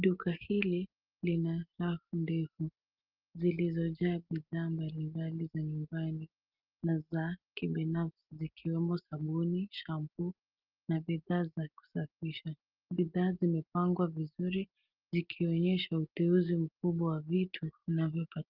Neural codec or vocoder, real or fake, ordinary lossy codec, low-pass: none; real; Opus, 32 kbps; 5.4 kHz